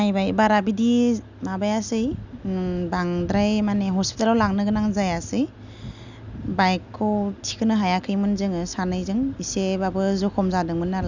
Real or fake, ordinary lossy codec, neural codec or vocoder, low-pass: real; none; none; 7.2 kHz